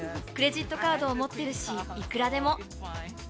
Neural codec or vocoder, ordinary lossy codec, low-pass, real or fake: none; none; none; real